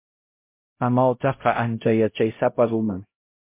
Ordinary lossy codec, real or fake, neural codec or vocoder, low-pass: MP3, 24 kbps; fake; codec, 16 kHz, 0.5 kbps, X-Codec, HuBERT features, trained on LibriSpeech; 3.6 kHz